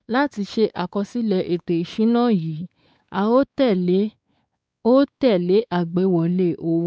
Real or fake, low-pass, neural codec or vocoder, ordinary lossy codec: fake; none; codec, 16 kHz, 4 kbps, X-Codec, WavLM features, trained on Multilingual LibriSpeech; none